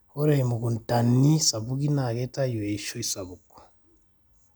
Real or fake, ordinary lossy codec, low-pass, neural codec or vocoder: real; none; none; none